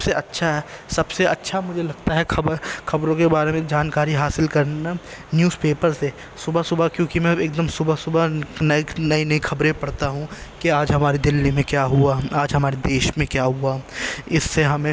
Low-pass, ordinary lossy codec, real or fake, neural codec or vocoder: none; none; real; none